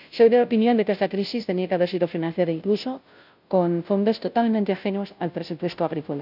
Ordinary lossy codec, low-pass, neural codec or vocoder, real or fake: none; 5.4 kHz; codec, 16 kHz, 0.5 kbps, FunCodec, trained on Chinese and English, 25 frames a second; fake